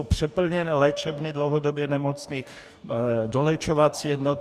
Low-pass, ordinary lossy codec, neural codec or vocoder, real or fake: 14.4 kHz; Opus, 64 kbps; codec, 44.1 kHz, 2.6 kbps, DAC; fake